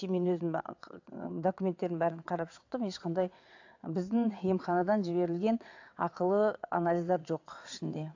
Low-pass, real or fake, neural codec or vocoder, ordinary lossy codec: 7.2 kHz; fake; vocoder, 22.05 kHz, 80 mel bands, WaveNeXt; AAC, 48 kbps